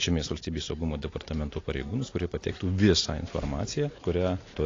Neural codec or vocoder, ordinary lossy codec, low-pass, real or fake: none; AAC, 32 kbps; 7.2 kHz; real